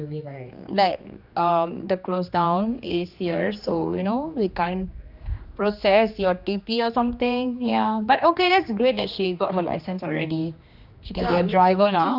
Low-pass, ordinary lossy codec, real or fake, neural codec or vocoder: 5.4 kHz; none; fake; codec, 16 kHz, 2 kbps, X-Codec, HuBERT features, trained on general audio